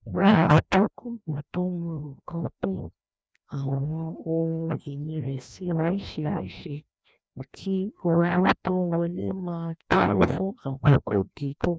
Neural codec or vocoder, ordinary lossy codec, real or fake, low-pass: codec, 16 kHz, 1 kbps, FreqCodec, larger model; none; fake; none